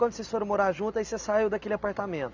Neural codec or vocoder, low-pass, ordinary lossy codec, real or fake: none; 7.2 kHz; none; real